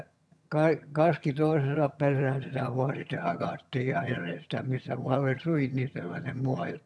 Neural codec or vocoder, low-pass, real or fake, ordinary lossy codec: vocoder, 22.05 kHz, 80 mel bands, HiFi-GAN; none; fake; none